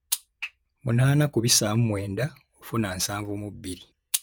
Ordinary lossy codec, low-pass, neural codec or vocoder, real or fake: none; none; vocoder, 48 kHz, 128 mel bands, Vocos; fake